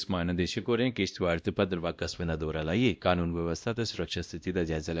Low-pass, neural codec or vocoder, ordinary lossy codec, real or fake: none; codec, 16 kHz, 1 kbps, X-Codec, WavLM features, trained on Multilingual LibriSpeech; none; fake